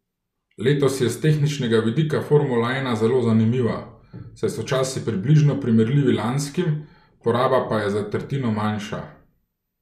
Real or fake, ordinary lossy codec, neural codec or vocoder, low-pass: real; none; none; 14.4 kHz